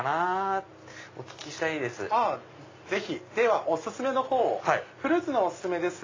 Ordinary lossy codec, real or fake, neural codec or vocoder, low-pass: AAC, 32 kbps; real; none; 7.2 kHz